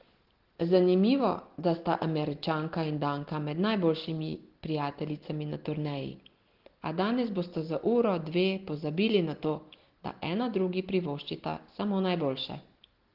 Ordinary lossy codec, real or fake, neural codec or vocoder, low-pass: Opus, 16 kbps; real; none; 5.4 kHz